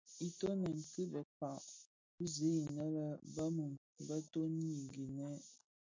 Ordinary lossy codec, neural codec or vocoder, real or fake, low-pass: MP3, 48 kbps; none; real; 7.2 kHz